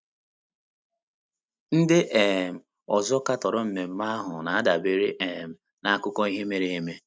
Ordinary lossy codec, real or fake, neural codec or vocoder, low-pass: none; real; none; none